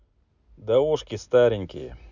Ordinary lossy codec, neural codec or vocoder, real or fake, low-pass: none; none; real; 7.2 kHz